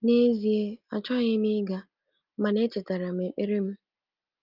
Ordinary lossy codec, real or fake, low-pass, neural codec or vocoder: Opus, 24 kbps; real; 5.4 kHz; none